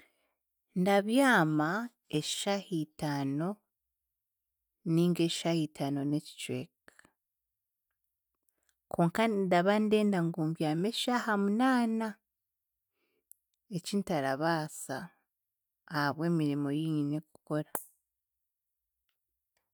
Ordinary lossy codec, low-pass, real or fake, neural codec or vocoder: none; none; real; none